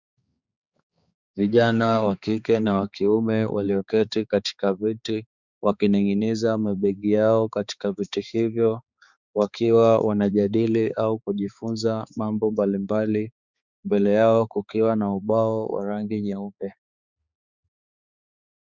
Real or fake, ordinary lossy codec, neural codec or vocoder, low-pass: fake; Opus, 64 kbps; codec, 16 kHz, 4 kbps, X-Codec, HuBERT features, trained on balanced general audio; 7.2 kHz